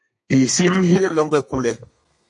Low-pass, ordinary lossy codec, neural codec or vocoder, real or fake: 10.8 kHz; MP3, 48 kbps; codec, 32 kHz, 1.9 kbps, SNAC; fake